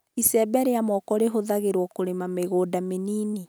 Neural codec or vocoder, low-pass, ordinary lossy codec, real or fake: none; none; none; real